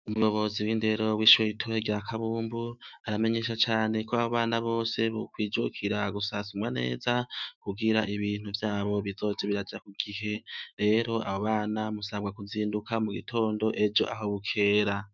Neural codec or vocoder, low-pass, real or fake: autoencoder, 48 kHz, 128 numbers a frame, DAC-VAE, trained on Japanese speech; 7.2 kHz; fake